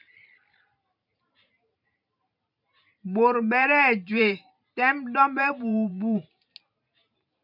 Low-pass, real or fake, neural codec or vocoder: 5.4 kHz; fake; vocoder, 44.1 kHz, 128 mel bands every 512 samples, BigVGAN v2